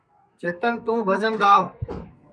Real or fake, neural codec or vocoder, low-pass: fake; codec, 32 kHz, 1.9 kbps, SNAC; 9.9 kHz